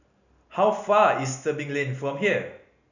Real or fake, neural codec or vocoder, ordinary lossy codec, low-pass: real; none; none; 7.2 kHz